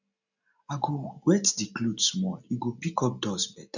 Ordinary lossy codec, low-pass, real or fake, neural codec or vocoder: none; 7.2 kHz; real; none